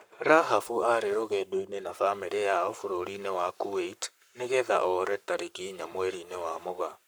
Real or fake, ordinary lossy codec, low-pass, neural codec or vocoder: fake; none; none; codec, 44.1 kHz, 7.8 kbps, Pupu-Codec